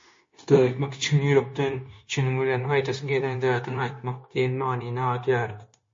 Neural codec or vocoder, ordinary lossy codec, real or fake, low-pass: codec, 16 kHz, 0.9 kbps, LongCat-Audio-Codec; MP3, 32 kbps; fake; 7.2 kHz